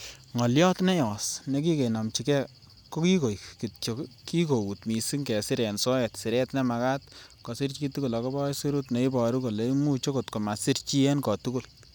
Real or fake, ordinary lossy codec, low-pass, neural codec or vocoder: real; none; none; none